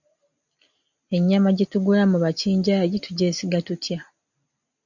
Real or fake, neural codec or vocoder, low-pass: real; none; 7.2 kHz